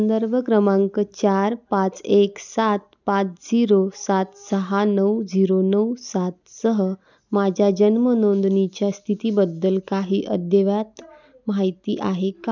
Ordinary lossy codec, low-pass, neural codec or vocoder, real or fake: none; 7.2 kHz; none; real